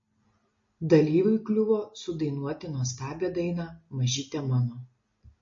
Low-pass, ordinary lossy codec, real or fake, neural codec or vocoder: 7.2 kHz; MP3, 32 kbps; real; none